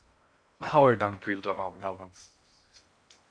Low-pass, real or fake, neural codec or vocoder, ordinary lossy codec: 9.9 kHz; fake; codec, 16 kHz in and 24 kHz out, 0.6 kbps, FocalCodec, streaming, 2048 codes; MP3, 64 kbps